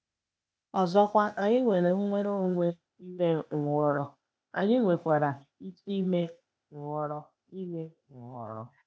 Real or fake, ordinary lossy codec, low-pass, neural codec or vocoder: fake; none; none; codec, 16 kHz, 0.8 kbps, ZipCodec